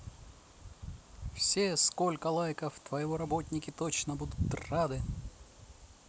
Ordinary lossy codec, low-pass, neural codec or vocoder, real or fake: none; none; none; real